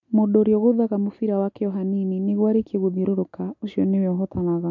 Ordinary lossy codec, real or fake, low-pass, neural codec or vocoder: MP3, 64 kbps; real; 7.2 kHz; none